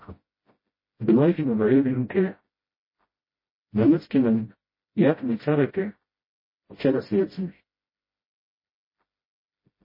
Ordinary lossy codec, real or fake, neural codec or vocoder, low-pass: MP3, 24 kbps; fake; codec, 16 kHz, 0.5 kbps, FreqCodec, smaller model; 5.4 kHz